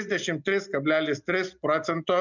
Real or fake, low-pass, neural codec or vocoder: real; 7.2 kHz; none